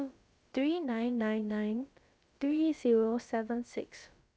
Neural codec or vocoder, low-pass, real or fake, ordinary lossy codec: codec, 16 kHz, about 1 kbps, DyCAST, with the encoder's durations; none; fake; none